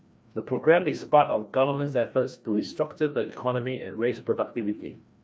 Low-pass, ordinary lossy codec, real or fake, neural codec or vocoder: none; none; fake; codec, 16 kHz, 1 kbps, FreqCodec, larger model